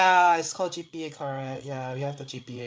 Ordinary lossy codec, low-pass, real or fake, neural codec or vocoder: none; none; fake; codec, 16 kHz, 8 kbps, FreqCodec, larger model